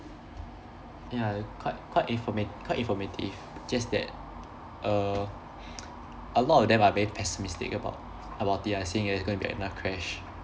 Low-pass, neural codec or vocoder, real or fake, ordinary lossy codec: none; none; real; none